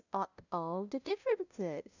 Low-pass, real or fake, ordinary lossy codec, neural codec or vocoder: 7.2 kHz; fake; none; codec, 16 kHz, 0.5 kbps, FunCodec, trained on LibriTTS, 25 frames a second